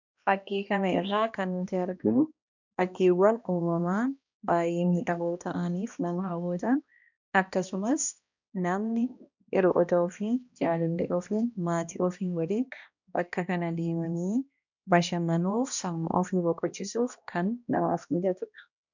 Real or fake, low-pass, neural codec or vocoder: fake; 7.2 kHz; codec, 16 kHz, 1 kbps, X-Codec, HuBERT features, trained on balanced general audio